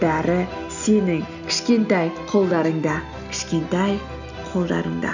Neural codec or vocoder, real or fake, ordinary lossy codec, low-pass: none; real; none; 7.2 kHz